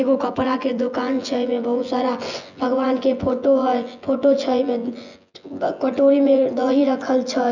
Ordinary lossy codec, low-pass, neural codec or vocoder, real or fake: none; 7.2 kHz; vocoder, 24 kHz, 100 mel bands, Vocos; fake